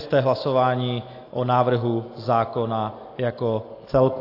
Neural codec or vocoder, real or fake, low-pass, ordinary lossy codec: none; real; 5.4 kHz; AAC, 32 kbps